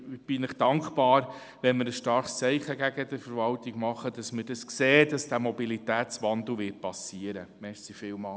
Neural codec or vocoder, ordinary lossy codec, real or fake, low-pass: none; none; real; none